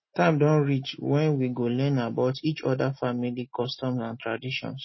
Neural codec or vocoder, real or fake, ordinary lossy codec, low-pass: none; real; MP3, 24 kbps; 7.2 kHz